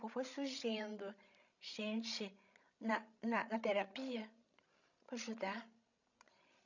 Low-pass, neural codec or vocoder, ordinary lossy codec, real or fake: 7.2 kHz; codec, 16 kHz, 16 kbps, FreqCodec, larger model; none; fake